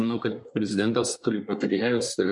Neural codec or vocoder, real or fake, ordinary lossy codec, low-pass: codec, 24 kHz, 1 kbps, SNAC; fake; MP3, 64 kbps; 10.8 kHz